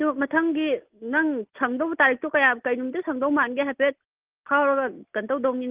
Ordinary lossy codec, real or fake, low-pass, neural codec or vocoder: Opus, 32 kbps; real; 3.6 kHz; none